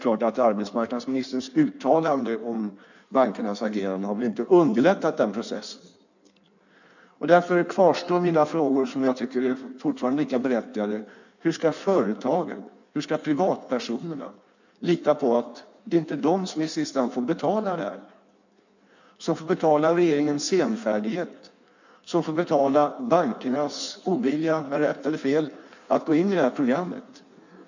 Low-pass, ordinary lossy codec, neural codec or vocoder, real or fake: 7.2 kHz; none; codec, 16 kHz in and 24 kHz out, 1.1 kbps, FireRedTTS-2 codec; fake